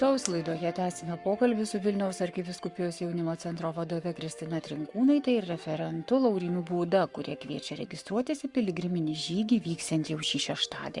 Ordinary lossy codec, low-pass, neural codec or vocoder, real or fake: Opus, 64 kbps; 10.8 kHz; codec, 44.1 kHz, 7.8 kbps, Pupu-Codec; fake